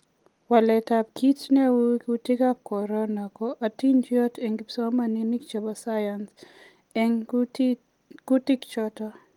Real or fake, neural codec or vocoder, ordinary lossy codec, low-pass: real; none; Opus, 32 kbps; 19.8 kHz